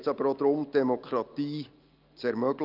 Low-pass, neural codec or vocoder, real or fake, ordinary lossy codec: 5.4 kHz; none; real; Opus, 24 kbps